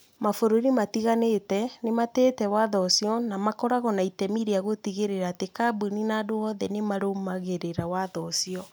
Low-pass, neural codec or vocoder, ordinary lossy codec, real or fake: none; none; none; real